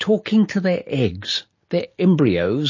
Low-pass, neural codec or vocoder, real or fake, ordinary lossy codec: 7.2 kHz; none; real; MP3, 32 kbps